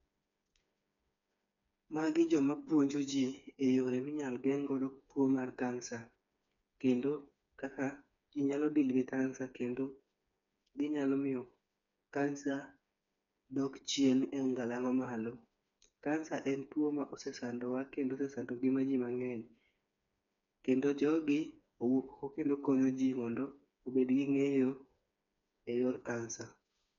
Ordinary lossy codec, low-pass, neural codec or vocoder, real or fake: none; 7.2 kHz; codec, 16 kHz, 4 kbps, FreqCodec, smaller model; fake